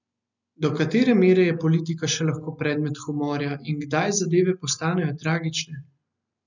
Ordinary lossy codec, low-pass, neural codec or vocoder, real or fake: none; 7.2 kHz; none; real